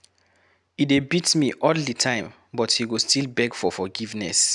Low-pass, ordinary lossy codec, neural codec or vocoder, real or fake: 10.8 kHz; none; none; real